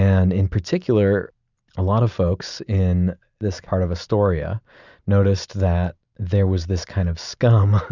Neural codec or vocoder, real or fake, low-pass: none; real; 7.2 kHz